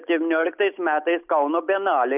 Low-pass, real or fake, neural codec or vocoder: 3.6 kHz; real; none